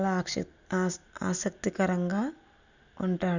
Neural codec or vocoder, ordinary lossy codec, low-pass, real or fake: none; none; 7.2 kHz; real